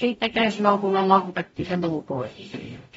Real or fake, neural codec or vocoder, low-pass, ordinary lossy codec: fake; codec, 44.1 kHz, 0.9 kbps, DAC; 19.8 kHz; AAC, 24 kbps